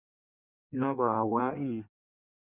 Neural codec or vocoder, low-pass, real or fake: codec, 16 kHz in and 24 kHz out, 1.1 kbps, FireRedTTS-2 codec; 3.6 kHz; fake